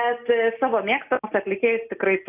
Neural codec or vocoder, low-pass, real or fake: none; 3.6 kHz; real